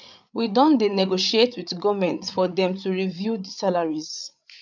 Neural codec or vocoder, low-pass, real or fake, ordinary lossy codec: codec, 16 kHz, 8 kbps, FreqCodec, larger model; 7.2 kHz; fake; none